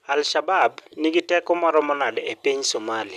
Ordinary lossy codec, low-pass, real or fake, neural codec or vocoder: none; 14.4 kHz; real; none